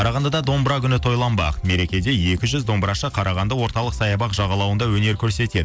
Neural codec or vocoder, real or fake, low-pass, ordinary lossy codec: none; real; none; none